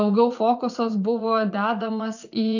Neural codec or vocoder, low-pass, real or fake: vocoder, 44.1 kHz, 80 mel bands, Vocos; 7.2 kHz; fake